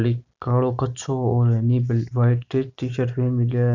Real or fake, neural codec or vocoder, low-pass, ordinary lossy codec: real; none; 7.2 kHz; none